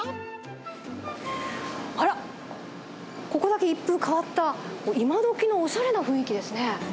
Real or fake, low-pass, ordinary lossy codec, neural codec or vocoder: real; none; none; none